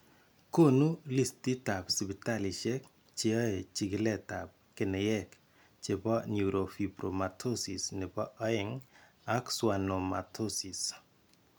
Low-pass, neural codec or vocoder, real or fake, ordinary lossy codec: none; none; real; none